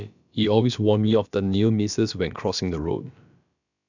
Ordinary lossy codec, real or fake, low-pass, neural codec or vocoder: none; fake; 7.2 kHz; codec, 16 kHz, about 1 kbps, DyCAST, with the encoder's durations